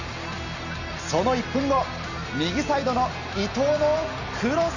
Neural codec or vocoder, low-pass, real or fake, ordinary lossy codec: none; 7.2 kHz; real; none